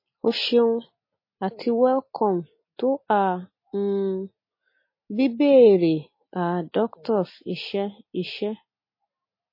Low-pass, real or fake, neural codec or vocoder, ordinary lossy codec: 5.4 kHz; real; none; MP3, 24 kbps